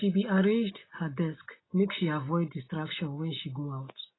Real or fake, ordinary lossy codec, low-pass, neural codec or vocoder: real; AAC, 16 kbps; 7.2 kHz; none